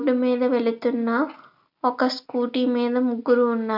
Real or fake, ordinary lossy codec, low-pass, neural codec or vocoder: real; none; 5.4 kHz; none